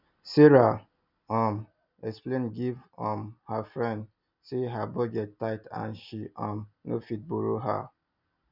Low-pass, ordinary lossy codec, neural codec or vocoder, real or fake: 5.4 kHz; none; none; real